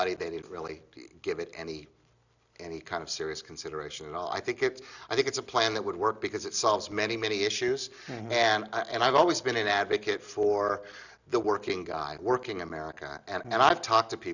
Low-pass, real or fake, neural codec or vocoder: 7.2 kHz; real; none